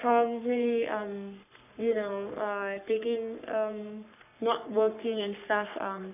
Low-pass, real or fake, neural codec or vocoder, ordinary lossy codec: 3.6 kHz; fake; codec, 44.1 kHz, 3.4 kbps, Pupu-Codec; none